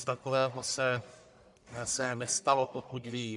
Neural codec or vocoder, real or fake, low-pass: codec, 44.1 kHz, 1.7 kbps, Pupu-Codec; fake; 10.8 kHz